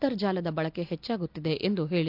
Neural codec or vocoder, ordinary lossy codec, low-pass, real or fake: none; none; 5.4 kHz; real